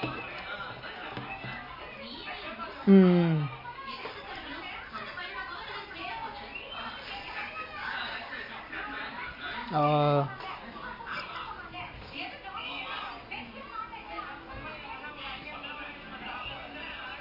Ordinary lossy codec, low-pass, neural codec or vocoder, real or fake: MP3, 24 kbps; 5.4 kHz; none; real